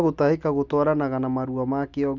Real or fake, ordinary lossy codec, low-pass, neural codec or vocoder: real; none; 7.2 kHz; none